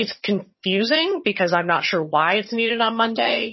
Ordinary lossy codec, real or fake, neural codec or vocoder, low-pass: MP3, 24 kbps; fake; vocoder, 22.05 kHz, 80 mel bands, HiFi-GAN; 7.2 kHz